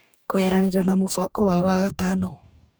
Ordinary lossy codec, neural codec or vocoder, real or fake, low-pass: none; codec, 44.1 kHz, 2.6 kbps, DAC; fake; none